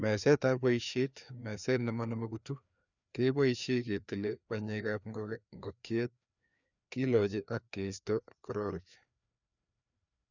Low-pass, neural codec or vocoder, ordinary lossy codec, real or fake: 7.2 kHz; codec, 16 kHz, 2 kbps, FreqCodec, larger model; none; fake